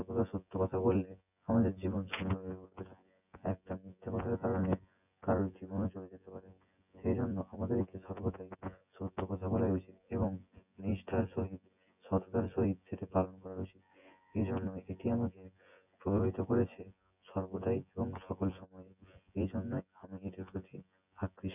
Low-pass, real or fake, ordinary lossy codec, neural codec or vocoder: 3.6 kHz; fake; none; vocoder, 24 kHz, 100 mel bands, Vocos